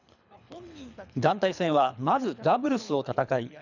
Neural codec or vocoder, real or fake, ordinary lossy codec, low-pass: codec, 24 kHz, 3 kbps, HILCodec; fake; none; 7.2 kHz